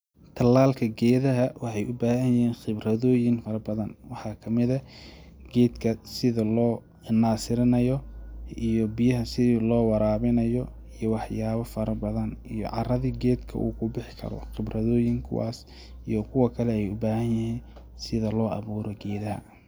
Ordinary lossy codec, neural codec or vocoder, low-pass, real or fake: none; none; none; real